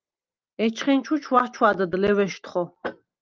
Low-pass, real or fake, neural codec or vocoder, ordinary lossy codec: 7.2 kHz; real; none; Opus, 24 kbps